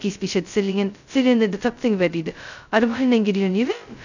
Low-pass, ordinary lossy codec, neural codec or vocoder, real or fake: 7.2 kHz; none; codec, 16 kHz, 0.2 kbps, FocalCodec; fake